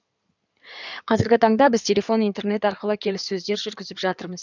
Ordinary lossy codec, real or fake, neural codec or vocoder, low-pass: none; fake; codec, 16 kHz in and 24 kHz out, 2.2 kbps, FireRedTTS-2 codec; 7.2 kHz